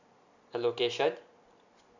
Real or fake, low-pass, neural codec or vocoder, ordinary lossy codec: real; 7.2 kHz; none; none